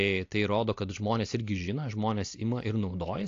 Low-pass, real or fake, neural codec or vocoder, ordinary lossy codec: 7.2 kHz; real; none; AAC, 48 kbps